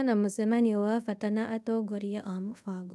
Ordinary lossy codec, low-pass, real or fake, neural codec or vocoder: none; none; fake; codec, 24 kHz, 0.5 kbps, DualCodec